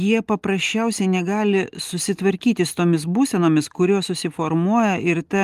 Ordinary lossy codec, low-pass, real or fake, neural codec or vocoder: Opus, 24 kbps; 14.4 kHz; real; none